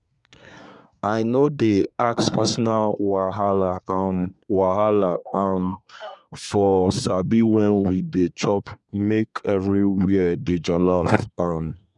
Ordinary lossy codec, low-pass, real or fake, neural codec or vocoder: none; 10.8 kHz; fake; codec, 24 kHz, 1 kbps, SNAC